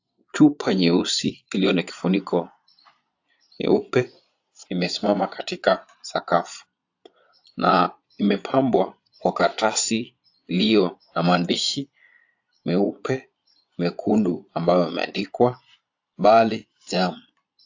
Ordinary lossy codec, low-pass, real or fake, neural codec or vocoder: AAC, 48 kbps; 7.2 kHz; fake; vocoder, 22.05 kHz, 80 mel bands, Vocos